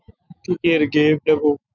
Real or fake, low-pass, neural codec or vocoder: fake; 7.2 kHz; autoencoder, 48 kHz, 128 numbers a frame, DAC-VAE, trained on Japanese speech